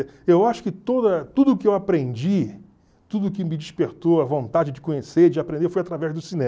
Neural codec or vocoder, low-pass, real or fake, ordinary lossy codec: none; none; real; none